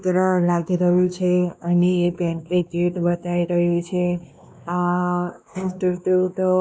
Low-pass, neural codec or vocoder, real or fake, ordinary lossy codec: none; codec, 16 kHz, 2 kbps, X-Codec, WavLM features, trained on Multilingual LibriSpeech; fake; none